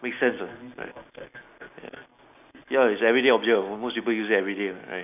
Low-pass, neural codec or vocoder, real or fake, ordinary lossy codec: 3.6 kHz; none; real; none